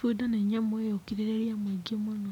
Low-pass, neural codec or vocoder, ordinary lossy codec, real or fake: 19.8 kHz; none; none; real